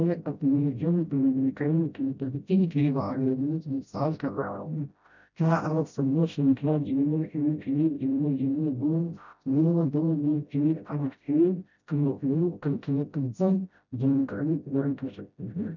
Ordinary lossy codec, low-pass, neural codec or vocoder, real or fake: none; 7.2 kHz; codec, 16 kHz, 0.5 kbps, FreqCodec, smaller model; fake